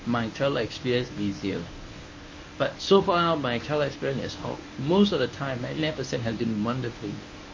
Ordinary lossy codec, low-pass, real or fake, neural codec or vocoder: MP3, 32 kbps; 7.2 kHz; fake; codec, 24 kHz, 0.9 kbps, WavTokenizer, medium speech release version 1